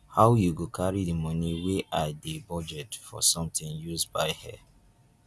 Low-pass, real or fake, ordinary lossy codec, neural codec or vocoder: none; real; none; none